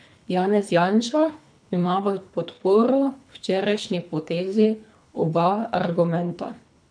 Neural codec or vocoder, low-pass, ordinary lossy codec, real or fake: codec, 24 kHz, 3 kbps, HILCodec; 9.9 kHz; none; fake